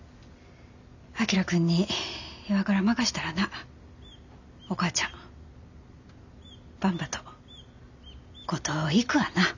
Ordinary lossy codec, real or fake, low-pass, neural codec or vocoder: none; real; 7.2 kHz; none